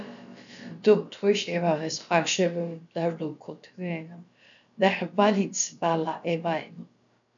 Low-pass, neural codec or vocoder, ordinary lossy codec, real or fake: 7.2 kHz; codec, 16 kHz, about 1 kbps, DyCAST, with the encoder's durations; MP3, 96 kbps; fake